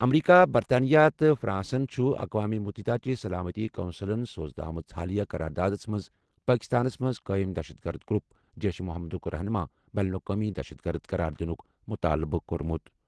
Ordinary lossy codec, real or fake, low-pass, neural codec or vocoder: Opus, 16 kbps; real; 10.8 kHz; none